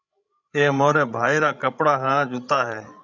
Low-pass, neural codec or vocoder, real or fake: 7.2 kHz; codec, 16 kHz, 16 kbps, FreqCodec, larger model; fake